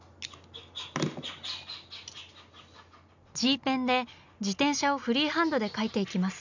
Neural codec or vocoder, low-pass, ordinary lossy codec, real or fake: none; 7.2 kHz; none; real